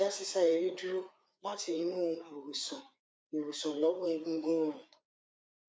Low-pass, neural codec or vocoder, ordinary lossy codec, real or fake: none; codec, 16 kHz, 4 kbps, FreqCodec, larger model; none; fake